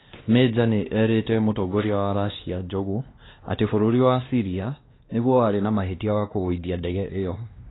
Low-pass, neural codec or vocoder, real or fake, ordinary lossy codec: 7.2 kHz; codec, 16 kHz, 2 kbps, X-Codec, WavLM features, trained on Multilingual LibriSpeech; fake; AAC, 16 kbps